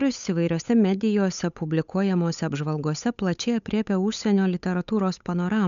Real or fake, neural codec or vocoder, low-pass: fake; codec, 16 kHz, 8 kbps, FunCodec, trained on Chinese and English, 25 frames a second; 7.2 kHz